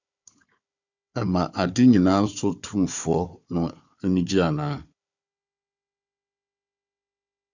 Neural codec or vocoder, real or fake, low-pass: codec, 16 kHz, 4 kbps, FunCodec, trained on Chinese and English, 50 frames a second; fake; 7.2 kHz